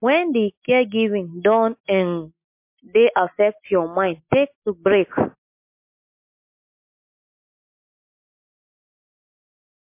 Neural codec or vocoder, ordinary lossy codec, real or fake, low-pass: none; MP3, 32 kbps; real; 3.6 kHz